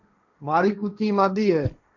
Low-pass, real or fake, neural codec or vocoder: 7.2 kHz; fake; codec, 16 kHz, 1.1 kbps, Voila-Tokenizer